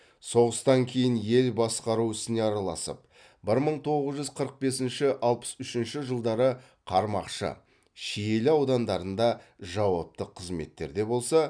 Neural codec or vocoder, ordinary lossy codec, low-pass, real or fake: none; none; 9.9 kHz; real